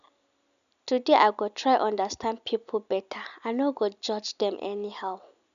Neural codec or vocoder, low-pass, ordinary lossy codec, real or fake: none; 7.2 kHz; none; real